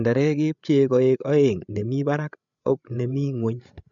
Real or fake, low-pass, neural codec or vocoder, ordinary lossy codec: fake; 7.2 kHz; codec, 16 kHz, 8 kbps, FreqCodec, larger model; none